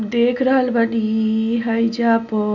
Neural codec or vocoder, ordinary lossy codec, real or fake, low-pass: none; none; real; 7.2 kHz